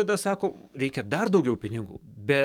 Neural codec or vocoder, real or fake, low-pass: codec, 44.1 kHz, 7.8 kbps, DAC; fake; 19.8 kHz